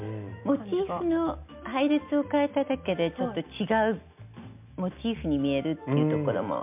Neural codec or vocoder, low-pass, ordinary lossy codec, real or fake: none; 3.6 kHz; MP3, 32 kbps; real